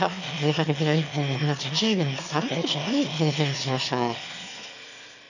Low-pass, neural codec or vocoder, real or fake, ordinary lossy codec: 7.2 kHz; autoencoder, 22.05 kHz, a latent of 192 numbers a frame, VITS, trained on one speaker; fake; none